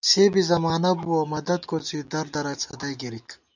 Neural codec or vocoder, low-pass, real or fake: none; 7.2 kHz; real